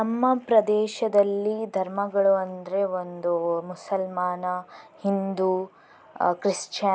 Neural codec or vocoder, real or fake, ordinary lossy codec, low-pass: none; real; none; none